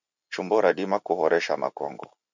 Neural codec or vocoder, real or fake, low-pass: none; real; 7.2 kHz